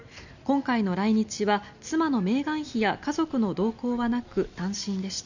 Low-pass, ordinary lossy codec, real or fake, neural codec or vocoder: 7.2 kHz; none; real; none